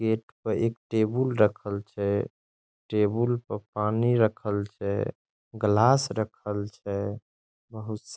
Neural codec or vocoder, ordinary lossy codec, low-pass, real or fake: none; none; none; real